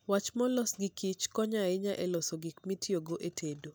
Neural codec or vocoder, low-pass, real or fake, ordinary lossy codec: none; none; real; none